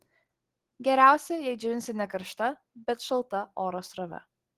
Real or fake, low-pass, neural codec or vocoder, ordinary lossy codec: real; 14.4 kHz; none; Opus, 16 kbps